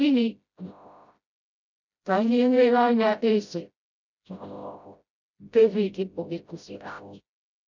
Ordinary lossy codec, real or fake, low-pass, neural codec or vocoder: none; fake; 7.2 kHz; codec, 16 kHz, 0.5 kbps, FreqCodec, smaller model